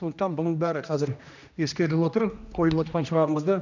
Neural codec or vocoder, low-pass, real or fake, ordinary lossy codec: codec, 16 kHz, 1 kbps, X-Codec, HuBERT features, trained on general audio; 7.2 kHz; fake; none